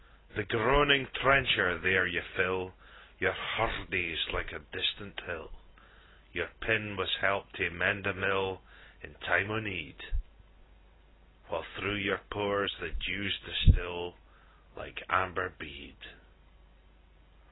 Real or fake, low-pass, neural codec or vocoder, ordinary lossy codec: real; 7.2 kHz; none; AAC, 16 kbps